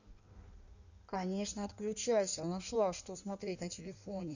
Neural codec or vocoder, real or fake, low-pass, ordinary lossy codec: codec, 16 kHz in and 24 kHz out, 1.1 kbps, FireRedTTS-2 codec; fake; 7.2 kHz; none